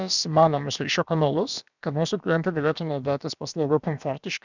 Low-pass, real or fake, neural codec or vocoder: 7.2 kHz; fake; codec, 16 kHz, about 1 kbps, DyCAST, with the encoder's durations